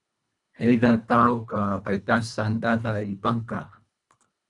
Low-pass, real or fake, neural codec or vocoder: 10.8 kHz; fake; codec, 24 kHz, 1.5 kbps, HILCodec